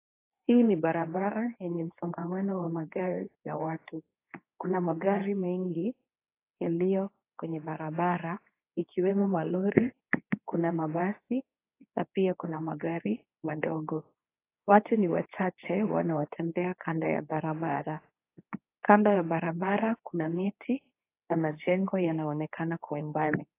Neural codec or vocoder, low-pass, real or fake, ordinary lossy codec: codec, 24 kHz, 0.9 kbps, WavTokenizer, medium speech release version 2; 3.6 kHz; fake; AAC, 24 kbps